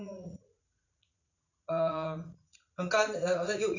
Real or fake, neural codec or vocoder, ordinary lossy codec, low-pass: fake; vocoder, 44.1 kHz, 80 mel bands, Vocos; none; 7.2 kHz